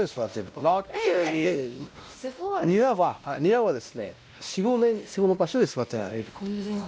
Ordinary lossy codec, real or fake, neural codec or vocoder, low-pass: none; fake; codec, 16 kHz, 1 kbps, X-Codec, WavLM features, trained on Multilingual LibriSpeech; none